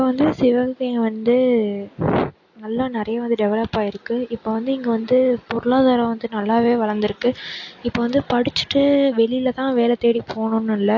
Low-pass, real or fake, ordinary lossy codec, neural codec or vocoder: 7.2 kHz; real; AAC, 48 kbps; none